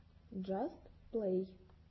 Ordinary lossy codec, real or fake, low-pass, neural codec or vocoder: MP3, 24 kbps; real; 7.2 kHz; none